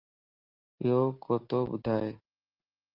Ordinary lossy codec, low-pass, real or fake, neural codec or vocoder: Opus, 32 kbps; 5.4 kHz; real; none